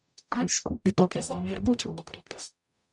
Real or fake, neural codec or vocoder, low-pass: fake; codec, 44.1 kHz, 0.9 kbps, DAC; 10.8 kHz